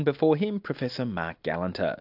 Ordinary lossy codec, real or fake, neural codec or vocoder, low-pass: AAC, 48 kbps; real; none; 5.4 kHz